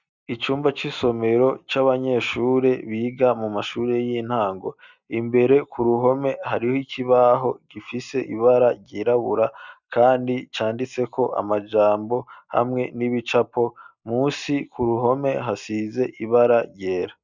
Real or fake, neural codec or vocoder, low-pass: real; none; 7.2 kHz